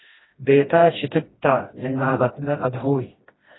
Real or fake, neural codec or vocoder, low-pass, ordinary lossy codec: fake; codec, 16 kHz, 1 kbps, FreqCodec, smaller model; 7.2 kHz; AAC, 16 kbps